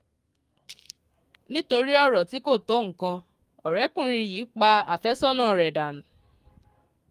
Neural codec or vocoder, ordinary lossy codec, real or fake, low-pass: codec, 44.1 kHz, 2.6 kbps, SNAC; Opus, 32 kbps; fake; 14.4 kHz